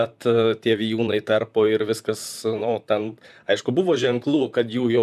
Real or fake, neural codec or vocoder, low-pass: fake; vocoder, 44.1 kHz, 128 mel bands, Pupu-Vocoder; 14.4 kHz